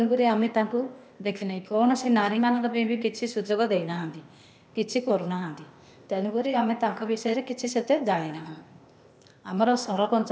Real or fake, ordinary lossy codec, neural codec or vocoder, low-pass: fake; none; codec, 16 kHz, 0.8 kbps, ZipCodec; none